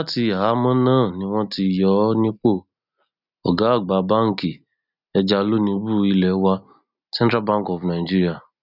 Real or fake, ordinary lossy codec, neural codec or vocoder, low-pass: real; none; none; 5.4 kHz